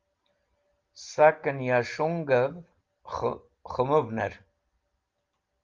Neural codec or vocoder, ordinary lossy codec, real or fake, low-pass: none; Opus, 32 kbps; real; 7.2 kHz